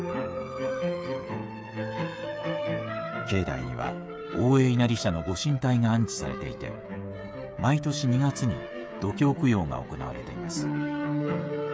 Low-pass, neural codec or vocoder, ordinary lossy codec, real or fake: none; codec, 16 kHz, 16 kbps, FreqCodec, smaller model; none; fake